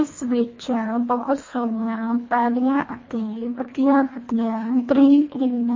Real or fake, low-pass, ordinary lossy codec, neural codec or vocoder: fake; 7.2 kHz; MP3, 32 kbps; codec, 24 kHz, 1.5 kbps, HILCodec